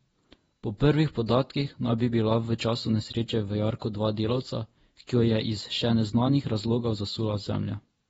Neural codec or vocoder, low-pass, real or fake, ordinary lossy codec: vocoder, 48 kHz, 128 mel bands, Vocos; 19.8 kHz; fake; AAC, 24 kbps